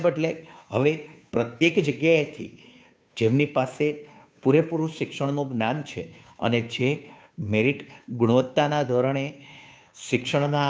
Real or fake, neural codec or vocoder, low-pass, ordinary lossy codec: fake; codec, 16 kHz, 2 kbps, FunCodec, trained on Chinese and English, 25 frames a second; none; none